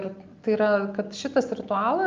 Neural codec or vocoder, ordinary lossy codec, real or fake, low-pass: none; Opus, 24 kbps; real; 7.2 kHz